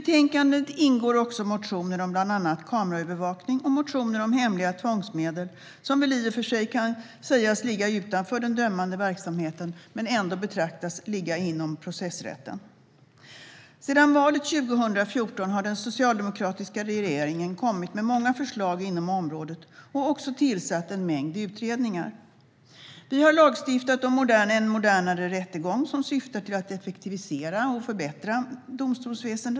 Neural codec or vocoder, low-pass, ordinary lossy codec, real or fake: none; none; none; real